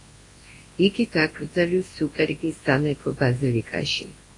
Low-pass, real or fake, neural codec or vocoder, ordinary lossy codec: 10.8 kHz; fake; codec, 24 kHz, 0.9 kbps, WavTokenizer, large speech release; AAC, 32 kbps